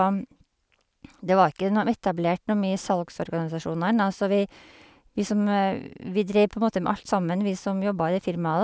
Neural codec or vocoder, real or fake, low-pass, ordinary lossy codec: none; real; none; none